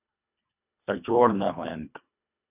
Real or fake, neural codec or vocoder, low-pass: fake; codec, 24 kHz, 1.5 kbps, HILCodec; 3.6 kHz